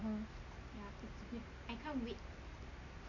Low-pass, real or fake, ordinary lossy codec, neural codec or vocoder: 7.2 kHz; real; none; none